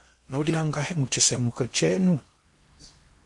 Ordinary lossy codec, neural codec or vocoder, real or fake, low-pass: MP3, 48 kbps; codec, 16 kHz in and 24 kHz out, 0.8 kbps, FocalCodec, streaming, 65536 codes; fake; 10.8 kHz